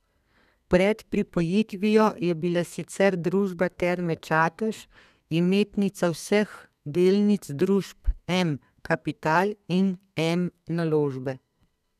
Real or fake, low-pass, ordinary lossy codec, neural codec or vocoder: fake; 14.4 kHz; none; codec, 32 kHz, 1.9 kbps, SNAC